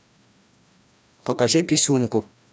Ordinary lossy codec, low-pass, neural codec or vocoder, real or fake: none; none; codec, 16 kHz, 1 kbps, FreqCodec, larger model; fake